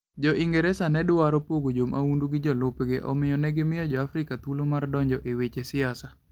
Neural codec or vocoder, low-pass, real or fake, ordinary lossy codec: none; 19.8 kHz; real; Opus, 24 kbps